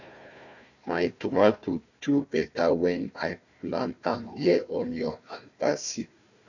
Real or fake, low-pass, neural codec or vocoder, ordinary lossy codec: fake; 7.2 kHz; codec, 16 kHz, 1 kbps, FunCodec, trained on Chinese and English, 50 frames a second; none